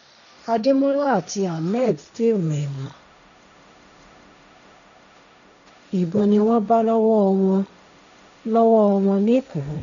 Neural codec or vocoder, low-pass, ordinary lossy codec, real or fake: codec, 16 kHz, 1.1 kbps, Voila-Tokenizer; 7.2 kHz; none; fake